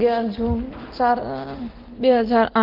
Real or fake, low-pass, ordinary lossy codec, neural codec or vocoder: real; 5.4 kHz; Opus, 16 kbps; none